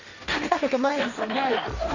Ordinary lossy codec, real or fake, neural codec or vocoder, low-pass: none; fake; codec, 16 kHz, 1.1 kbps, Voila-Tokenizer; 7.2 kHz